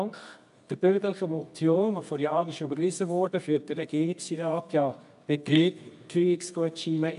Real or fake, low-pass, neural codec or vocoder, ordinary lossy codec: fake; 10.8 kHz; codec, 24 kHz, 0.9 kbps, WavTokenizer, medium music audio release; MP3, 96 kbps